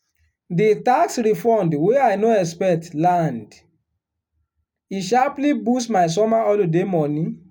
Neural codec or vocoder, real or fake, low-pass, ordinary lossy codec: none; real; 19.8 kHz; MP3, 96 kbps